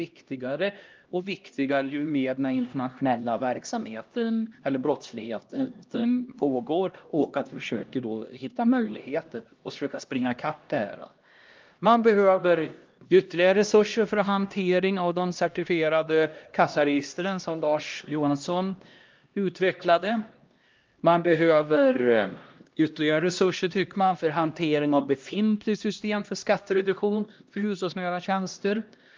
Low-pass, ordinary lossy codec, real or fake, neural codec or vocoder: 7.2 kHz; Opus, 32 kbps; fake; codec, 16 kHz, 1 kbps, X-Codec, HuBERT features, trained on LibriSpeech